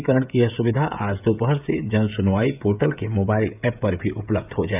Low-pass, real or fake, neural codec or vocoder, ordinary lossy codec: 3.6 kHz; fake; codec, 16 kHz, 16 kbps, FreqCodec, smaller model; none